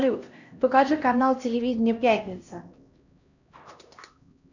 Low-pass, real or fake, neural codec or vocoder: 7.2 kHz; fake; codec, 16 kHz, 1 kbps, X-Codec, HuBERT features, trained on LibriSpeech